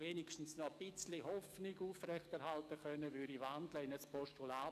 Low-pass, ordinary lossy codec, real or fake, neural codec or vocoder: 14.4 kHz; none; fake; codec, 44.1 kHz, 7.8 kbps, DAC